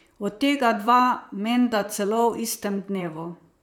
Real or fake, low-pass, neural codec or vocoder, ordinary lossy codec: fake; 19.8 kHz; vocoder, 44.1 kHz, 128 mel bands, Pupu-Vocoder; none